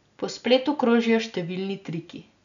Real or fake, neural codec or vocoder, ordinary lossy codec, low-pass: real; none; none; 7.2 kHz